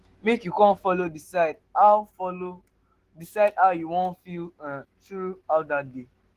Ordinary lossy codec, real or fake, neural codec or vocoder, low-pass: Opus, 16 kbps; fake; autoencoder, 48 kHz, 128 numbers a frame, DAC-VAE, trained on Japanese speech; 14.4 kHz